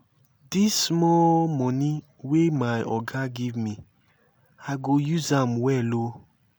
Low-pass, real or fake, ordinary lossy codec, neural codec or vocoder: none; real; none; none